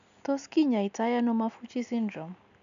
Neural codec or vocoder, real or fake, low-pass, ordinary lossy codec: none; real; 7.2 kHz; none